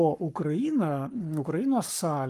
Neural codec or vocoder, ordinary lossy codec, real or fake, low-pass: codec, 44.1 kHz, 7.8 kbps, Pupu-Codec; Opus, 32 kbps; fake; 14.4 kHz